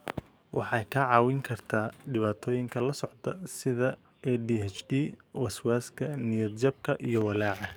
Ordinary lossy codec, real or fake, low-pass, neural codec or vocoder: none; fake; none; codec, 44.1 kHz, 7.8 kbps, DAC